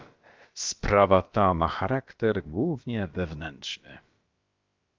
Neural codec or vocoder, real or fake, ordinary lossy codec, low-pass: codec, 16 kHz, about 1 kbps, DyCAST, with the encoder's durations; fake; Opus, 24 kbps; 7.2 kHz